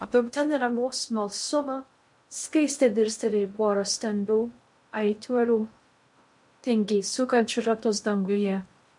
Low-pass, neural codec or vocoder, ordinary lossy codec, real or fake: 10.8 kHz; codec, 16 kHz in and 24 kHz out, 0.6 kbps, FocalCodec, streaming, 2048 codes; MP3, 64 kbps; fake